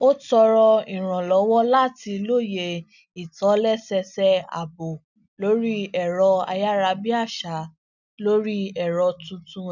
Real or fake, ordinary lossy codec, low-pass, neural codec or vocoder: real; none; 7.2 kHz; none